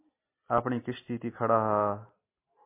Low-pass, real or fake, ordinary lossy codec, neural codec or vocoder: 3.6 kHz; real; MP3, 24 kbps; none